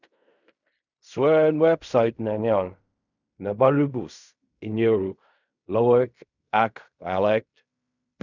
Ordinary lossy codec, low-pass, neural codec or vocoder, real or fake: none; 7.2 kHz; codec, 16 kHz in and 24 kHz out, 0.4 kbps, LongCat-Audio-Codec, fine tuned four codebook decoder; fake